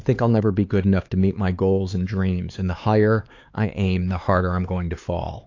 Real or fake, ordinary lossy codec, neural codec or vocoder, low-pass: fake; AAC, 48 kbps; codec, 16 kHz, 4 kbps, X-Codec, HuBERT features, trained on LibriSpeech; 7.2 kHz